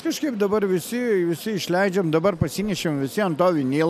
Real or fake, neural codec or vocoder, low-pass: real; none; 14.4 kHz